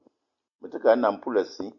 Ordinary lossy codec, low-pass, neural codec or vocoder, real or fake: AAC, 64 kbps; 7.2 kHz; none; real